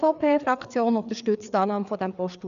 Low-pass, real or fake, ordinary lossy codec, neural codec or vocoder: 7.2 kHz; fake; MP3, 96 kbps; codec, 16 kHz, 4 kbps, FreqCodec, larger model